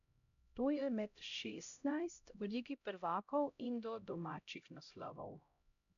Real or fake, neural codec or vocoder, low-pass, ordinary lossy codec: fake; codec, 16 kHz, 0.5 kbps, X-Codec, HuBERT features, trained on LibriSpeech; 7.2 kHz; none